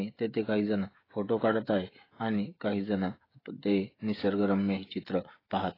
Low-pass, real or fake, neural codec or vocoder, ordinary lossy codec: 5.4 kHz; fake; codec, 16 kHz, 16 kbps, FreqCodec, smaller model; AAC, 24 kbps